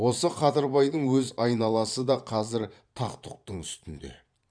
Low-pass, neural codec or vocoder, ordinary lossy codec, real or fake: 9.9 kHz; vocoder, 22.05 kHz, 80 mel bands, Vocos; none; fake